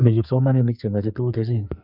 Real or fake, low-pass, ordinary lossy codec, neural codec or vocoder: fake; 5.4 kHz; none; codec, 44.1 kHz, 2.6 kbps, SNAC